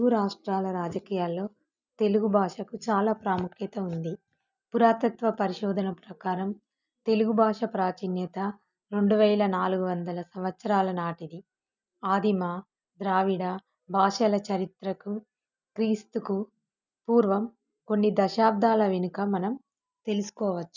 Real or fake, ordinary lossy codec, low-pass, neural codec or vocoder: real; none; 7.2 kHz; none